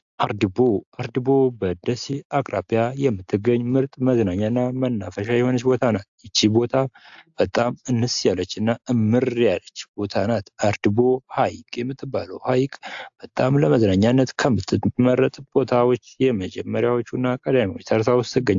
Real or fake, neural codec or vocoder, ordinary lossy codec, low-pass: real; none; AAC, 64 kbps; 7.2 kHz